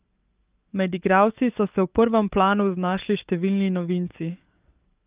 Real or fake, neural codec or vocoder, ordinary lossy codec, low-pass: fake; codec, 44.1 kHz, 7.8 kbps, DAC; Opus, 24 kbps; 3.6 kHz